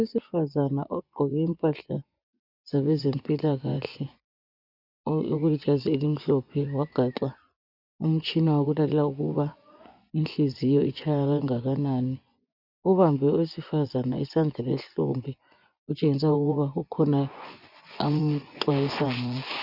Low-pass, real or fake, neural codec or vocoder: 5.4 kHz; fake; vocoder, 24 kHz, 100 mel bands, Vocos